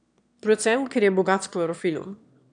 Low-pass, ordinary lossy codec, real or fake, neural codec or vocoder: 9.9 kHz; none; fake; autoencoder, 22.05 kHz, a latent of 192 numbers a frame, VITS, trained on one speaker